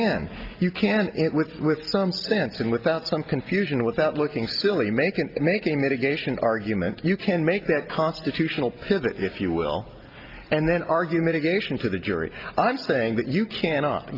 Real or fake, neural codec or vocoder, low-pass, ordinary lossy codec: real; none; 5.4 kHz; Opus, 24 kbps